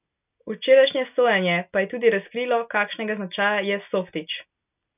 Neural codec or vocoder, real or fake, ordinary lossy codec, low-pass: none; real; none; 3.6 kHz